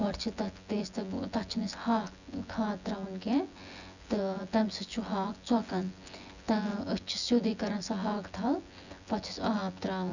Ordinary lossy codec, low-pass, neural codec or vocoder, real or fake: none; 7.2 kHz; vocoder, 24 kHz, 100 mel bands, Vocos; fake